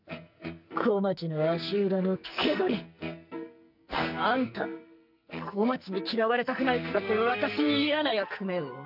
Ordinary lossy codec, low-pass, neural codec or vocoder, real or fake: none; 5.4 kHz; codec, 44.1 kHz, 2.6 kbps, SNAC; fake